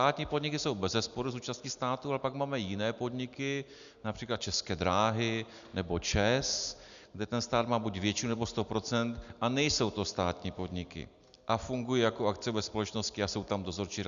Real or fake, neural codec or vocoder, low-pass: real; none; 7.2 kHz